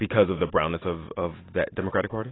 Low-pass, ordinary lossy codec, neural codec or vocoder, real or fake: 7.2 kHz; AAC, 16 kbps; none; real